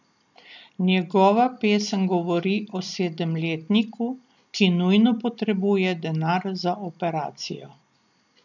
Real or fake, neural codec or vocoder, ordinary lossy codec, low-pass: real; none; none; 7.2 kHz